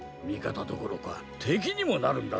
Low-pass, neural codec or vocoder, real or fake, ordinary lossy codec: none; none; real; none